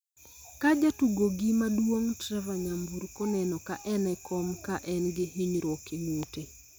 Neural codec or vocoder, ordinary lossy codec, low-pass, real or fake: none; none; none; real